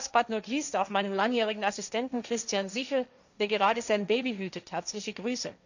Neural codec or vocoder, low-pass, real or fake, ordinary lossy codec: codec, 16 kHz, 1.1 kbps, Voila-Tokenizer; 7.2 kHz; fake; none